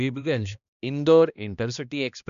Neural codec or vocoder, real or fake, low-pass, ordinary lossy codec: codec, 16 kHz, 1 kbps, X-Codec, HuBERT features, trained on balanced general audio; fake; 7.2 kHz; none